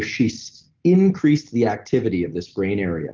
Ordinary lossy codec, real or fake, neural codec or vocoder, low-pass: Opus, 16 kbps; real; none; 7.2 kHz